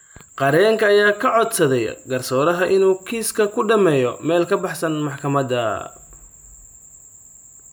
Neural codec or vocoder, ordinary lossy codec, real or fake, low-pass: none; none; real; none